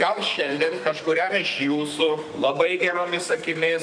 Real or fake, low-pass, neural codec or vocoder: fake; 9.9 kHz; codec, 32 kHz, 1.9 kbps, SNAC